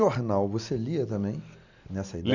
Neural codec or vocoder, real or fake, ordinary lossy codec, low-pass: none; real; none; 7.2 kHz